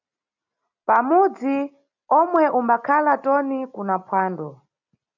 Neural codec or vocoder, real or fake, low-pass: none; real; 7.2 kHz